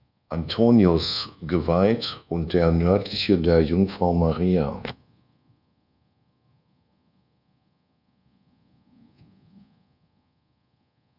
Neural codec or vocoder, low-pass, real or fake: codec, 24 kHz, 1.2 kbps, DualCodec; 5.4 kHz; fake